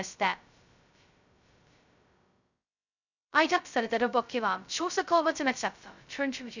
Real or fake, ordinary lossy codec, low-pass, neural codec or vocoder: fake; none; 7.2 kHz; codec, 16 kHz, 0.2 kbps, FocalCodec